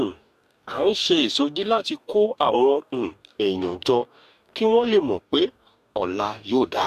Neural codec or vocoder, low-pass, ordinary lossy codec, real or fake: codec, 44.1 kHz, 2.6 kbps, DAC; 14.4 kHz; none; fake